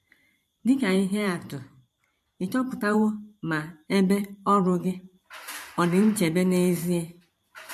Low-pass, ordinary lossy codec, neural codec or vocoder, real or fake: 14.4 kHz; MP3, 64 kbps; vocoder, 44.1 kHz, 128 mel bands every 512 samples, BigVGAN v2; fake